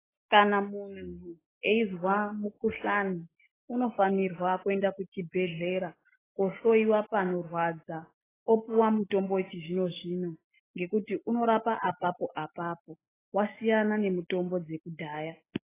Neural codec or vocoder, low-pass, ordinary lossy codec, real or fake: none; 3.6 kHz; AAC, 16 kbps; real